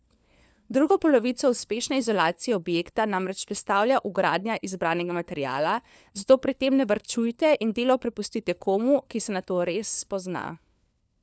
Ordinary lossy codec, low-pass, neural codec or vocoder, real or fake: none; none; codec, 16 kHz, 4 kbps, FunCodec, trained on LibriTTS, 50 frames a second; fake